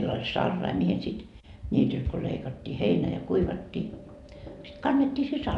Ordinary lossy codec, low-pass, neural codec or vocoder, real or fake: none; 10.8 kHz; none; real